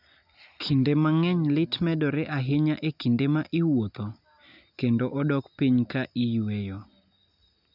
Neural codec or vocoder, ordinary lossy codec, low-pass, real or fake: none; none; 5.4 kHz; real